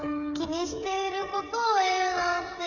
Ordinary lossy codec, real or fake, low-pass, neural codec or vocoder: none; fake; 7.2 kHz; codec, 16 kHz, 8 kbps, FreqCodec, smaller model